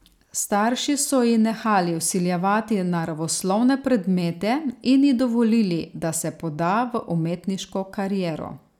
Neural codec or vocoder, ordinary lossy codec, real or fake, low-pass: none; none; real; 19.8 kHz